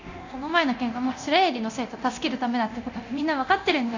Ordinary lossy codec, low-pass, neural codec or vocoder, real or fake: none; 7.2 kHz; codec, 24 kHz, 0.9 kbps, DualCodec; fake